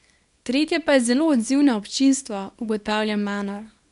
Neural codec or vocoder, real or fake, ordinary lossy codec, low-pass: codec, 24 kHz, 0.9 kbps, WavTokenizer, small release; fake; none; 10.8 kHz